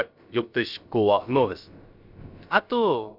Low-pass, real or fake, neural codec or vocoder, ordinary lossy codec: 5.4 kHz; fake; codec, 16 kHz, about 1 kbps, DyCAST, with the encoder's durations; none